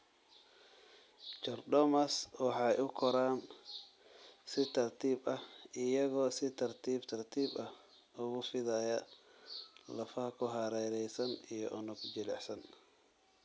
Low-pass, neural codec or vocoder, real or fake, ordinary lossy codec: none; none; real; none